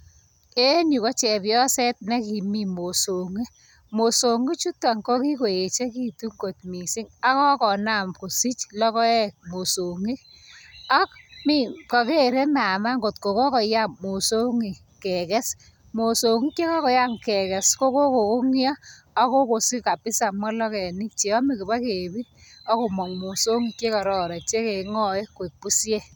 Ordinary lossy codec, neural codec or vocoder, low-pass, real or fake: none; none; none; real